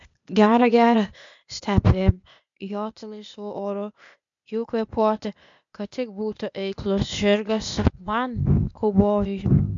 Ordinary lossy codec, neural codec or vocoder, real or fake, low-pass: MP3, 64 kbps; codec, 16 kHz, 0.8 kbps, ZipCodec; fake; 7.2 kHz